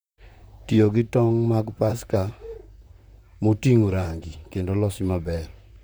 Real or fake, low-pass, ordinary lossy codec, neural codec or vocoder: fake; none; none; vocoder, 44.1 kHz, 128 mel bands, Pupu-Vocoder